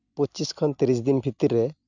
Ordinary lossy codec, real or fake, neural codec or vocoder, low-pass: none; real; none; 7.2 kHz